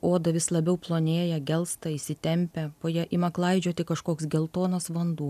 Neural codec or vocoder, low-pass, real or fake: none; 14.4 kHz; real